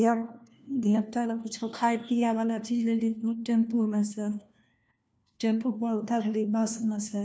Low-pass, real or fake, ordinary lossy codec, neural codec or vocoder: none; fake; none; codec, 16 kHz, 1 kbps, FunCodec, trained on LibriTTS, 50 frames a second